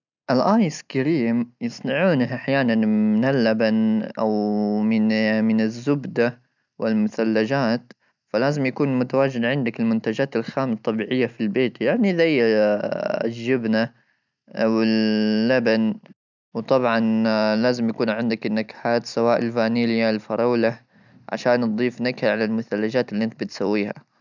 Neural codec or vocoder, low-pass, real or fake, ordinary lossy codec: none; 7.2 kHz; real; none